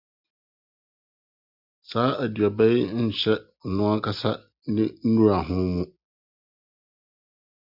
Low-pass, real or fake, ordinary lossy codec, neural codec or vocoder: 5.4 kHz; real; Opus, 64 kbps; none